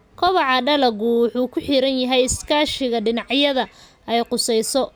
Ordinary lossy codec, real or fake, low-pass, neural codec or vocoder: none; real; none; none